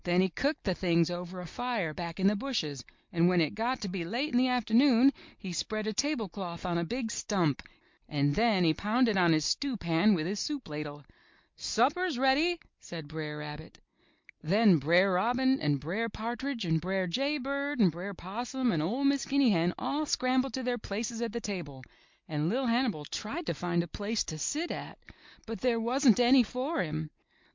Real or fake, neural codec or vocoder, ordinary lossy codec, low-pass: real; none; MP3, 64 kbps; 7.2 kHz